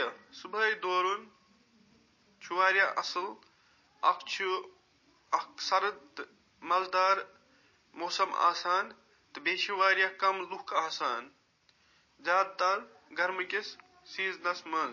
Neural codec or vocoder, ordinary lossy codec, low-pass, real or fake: none; MP3, 32 kbps; 7.2 kHz; real